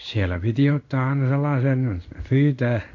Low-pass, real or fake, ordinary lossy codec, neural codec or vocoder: 7.2 kHz; fake; none; codec, 16 kHz in and 24 kHz out, 1 kbps, XY-Tokenizer